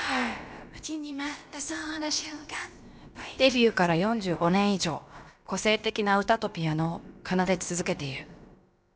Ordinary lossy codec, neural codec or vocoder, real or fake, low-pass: none; codec, 16 kHz, about 1 kbps, DyCAST, with the encoder's durations; fake; none